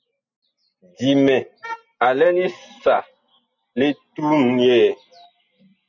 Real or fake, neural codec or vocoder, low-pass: real; none; 7.2 kHz